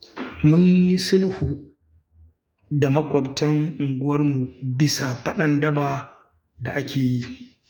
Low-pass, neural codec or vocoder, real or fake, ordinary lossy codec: 19.8 kHz; codec, 44.1 kHz, 2.6 kbps, DAC; fake; none